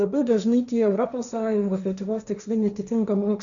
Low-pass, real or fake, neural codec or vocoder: 7.2 kHz; fake; codec, 16 kHz, 1.1 kbps, Voila-Tokenizer